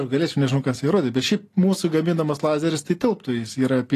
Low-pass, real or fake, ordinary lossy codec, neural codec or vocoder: 14.4 kHz; real; AAC, 48 kbps; none